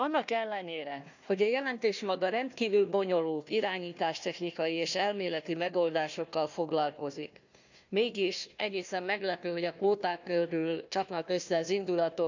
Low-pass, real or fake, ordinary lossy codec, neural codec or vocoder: 7.2 kHz; fake; none; codec, 16 kHz, 1 kbps, FunCodec, trained on Chinese and English, 50 frames a second